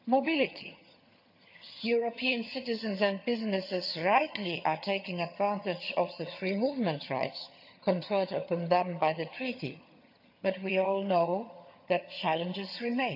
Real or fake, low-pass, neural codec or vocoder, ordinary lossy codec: fake; 5.4 kHz; vocoder, 22.05 kHz, 80 mel bands, HiFi-GAN; none